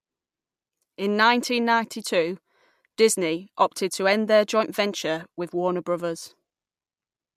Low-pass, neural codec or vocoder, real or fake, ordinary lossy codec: 14.4 kHz; none; real; MP3, 96 kbps